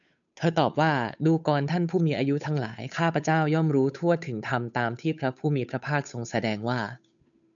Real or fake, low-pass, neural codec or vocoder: fake; 7.2 kHz; codec, 16 kHz, 8 kbps, FunCodec, trained on Chinese and English, 25 frames a second